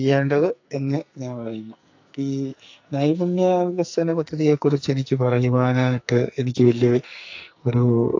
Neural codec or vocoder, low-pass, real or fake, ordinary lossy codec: codec, 44.1 kHz, 2.6 kbps, SNAC; 7.2 kHz; fake; AAC, 48 kbps